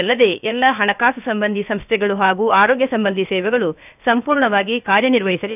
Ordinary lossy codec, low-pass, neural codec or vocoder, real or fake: none; 3.6 kHz; codec, 16 kHz, about 1 kbps, DyCAST, with the encoder's durations; fake